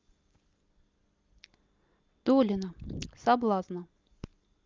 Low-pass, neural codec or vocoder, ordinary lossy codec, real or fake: 7.2 kHz; none; Opus, 24 kbps; real